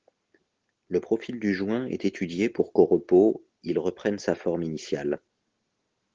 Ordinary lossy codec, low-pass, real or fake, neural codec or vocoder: Opus, 16 kbps; 7.2 kHz; real; none